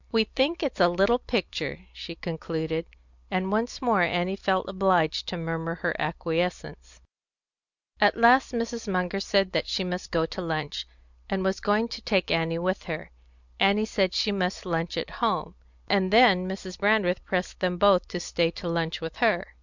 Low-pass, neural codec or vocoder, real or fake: 7.2 kHz; none; real